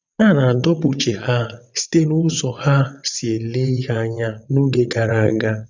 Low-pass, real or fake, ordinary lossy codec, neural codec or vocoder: 7.2 kHz; fake; none; vocoder, 22.05 kHz, 80 mel bands, WaveNeXt